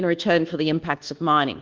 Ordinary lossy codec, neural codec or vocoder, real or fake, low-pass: Opus, 32 kbps; codec, 24 kHz, 1.2 kbps, DualCodec; fake; 7.2 kHz